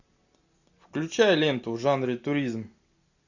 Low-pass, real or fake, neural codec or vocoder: 7.2 kHz; real; none